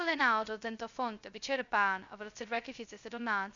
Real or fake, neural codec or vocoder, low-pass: fake; codec, 16 kHz, 0.2 kbps, FocalCodec; 7.2 kHz